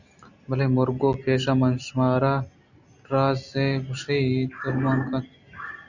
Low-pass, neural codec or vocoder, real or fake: 7.2 kHz; none; real